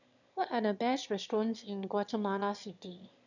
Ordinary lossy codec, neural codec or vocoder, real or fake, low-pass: none; autoencoder, 22.05 kHz, a latent of 192 numbers a frame, VITS, trained on one speaker; fake; 7.2 kHz